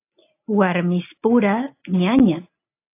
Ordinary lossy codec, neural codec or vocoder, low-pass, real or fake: AAC, 24 kbps; none; 3.6 kHz; real